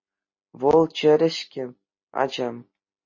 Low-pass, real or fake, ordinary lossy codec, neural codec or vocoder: 7.2 kHz; real; MP3, 32 kbps; none